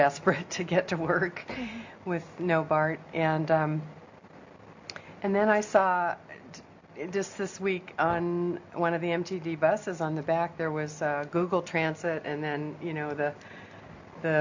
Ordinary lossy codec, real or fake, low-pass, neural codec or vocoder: AAC, 48 kbps; real; 7.2 kHz; none